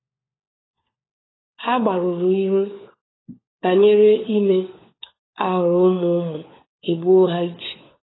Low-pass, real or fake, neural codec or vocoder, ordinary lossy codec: 7.2 kHz; fake; codec, 16 kHz, 4 kbps, FunCodec, trained on LibriTTS, 50 frames a second; AAC, 16 kbps